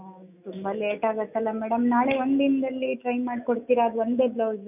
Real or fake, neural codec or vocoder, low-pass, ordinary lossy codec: fake; autoencoder, 48 kHz, 128 numbers a frame, DAC-VAE, trained on Japanese speech; 3.6 kHz; none